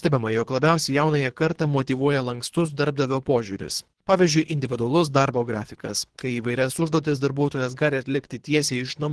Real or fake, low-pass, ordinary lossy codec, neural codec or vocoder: fake; 10.8 kHz; Opus, 16 kbps; codec, 24 kHz, 3 kbps, HILCodec